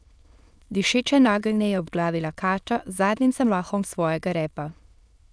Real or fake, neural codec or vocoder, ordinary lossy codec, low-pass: fake; autoencoder, 22.05 kHz, a latent of 192 numbers a frame, VITS, trained on many speakers; none; none